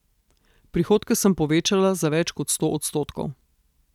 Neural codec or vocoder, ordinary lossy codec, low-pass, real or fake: none; none; 19.8 kHz; real